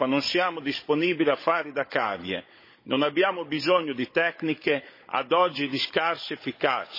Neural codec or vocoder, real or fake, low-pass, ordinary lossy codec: codec, 16 kHz, 16 kbps, FunCodec, trained on Chinese and English, 50 frames a second; fake; 5.4 kHz; MP3, 24 kbps